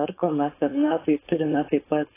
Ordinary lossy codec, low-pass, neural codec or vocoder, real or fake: AAC, 16 kbps; 3.6 kHz; codec, 16 kHz, 4.8 kbps, FACodec; fake